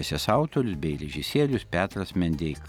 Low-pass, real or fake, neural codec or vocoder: 19.8 kHz; real; none